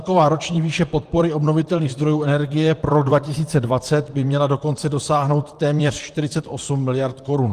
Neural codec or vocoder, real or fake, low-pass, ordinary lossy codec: vocoder, 44.1 kHz, 128 mel bands, Pupu-Vocoder; fake; 14.4 kHz; Opus, 24 kbps